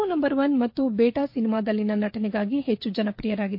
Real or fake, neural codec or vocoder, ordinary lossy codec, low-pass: fake; codec, 16 kHz, 16 kbps, FreqCodec, smaller model; MP3, 32 kbps; 5.4 kHz